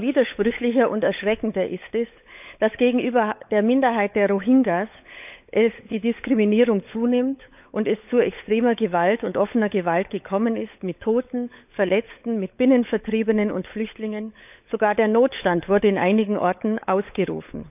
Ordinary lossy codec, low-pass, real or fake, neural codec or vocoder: none; 3.6 kHz; fake; codec, 16 kHz, 16 kbps, FunCodec, trained on LibriTTS, 50 frames a second